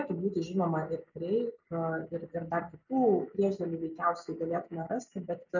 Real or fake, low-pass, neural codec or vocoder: real; 7.2 kHz; none